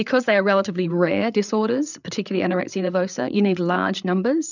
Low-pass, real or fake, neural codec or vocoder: 7.2 kHz; fake; codec, 16 kHz, 8 kbps, FreqCodec, larger model